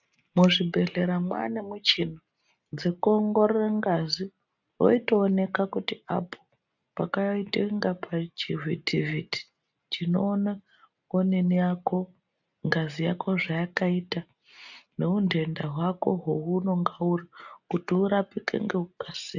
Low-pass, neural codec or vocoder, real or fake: 7.2 kHz; none; real